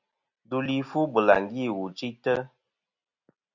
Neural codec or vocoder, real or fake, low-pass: none; real; 7.2 kHz